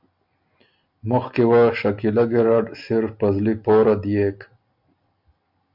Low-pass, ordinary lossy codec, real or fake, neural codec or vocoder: 5.4 kHz; MP3, 48 kbps; real; none